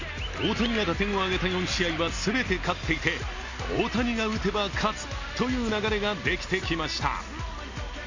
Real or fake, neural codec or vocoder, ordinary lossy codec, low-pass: real; none; Opus, 64 kbps; 7.2 kHz